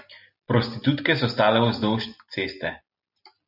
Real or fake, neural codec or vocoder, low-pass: real; none; 5.4 kHz